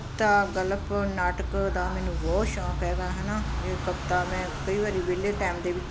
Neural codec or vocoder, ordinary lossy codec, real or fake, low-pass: none; none; real; none